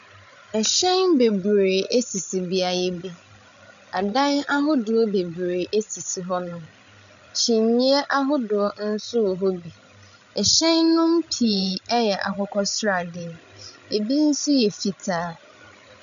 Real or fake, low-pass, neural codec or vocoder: fake; 7.2 kHz; codec, 16 kHz, 16 kbps, FreqCodec, larger model